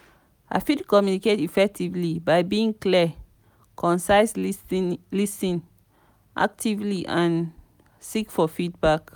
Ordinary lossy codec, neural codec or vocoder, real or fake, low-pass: none; none; real; none